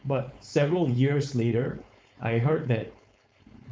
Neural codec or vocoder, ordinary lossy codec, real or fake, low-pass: codec, 16 kHz, 4.8 kbps, FACodec; none; fake; none